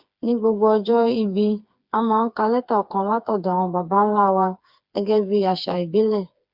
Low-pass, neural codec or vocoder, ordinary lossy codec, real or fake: 5.4 kHz; codec, 16 kHz, 4 kbps, FreqCodec, smaller model; Opus, 64 kbps; fake